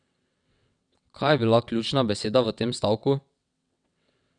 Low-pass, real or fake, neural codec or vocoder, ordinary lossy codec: 9.9 kHz; fake; vocoder, 22.05 kHz, 80 mel bands, WaveNeXt; none